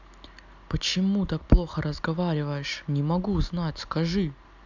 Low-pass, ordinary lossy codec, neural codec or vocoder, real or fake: 7.2 kHz; none; none; real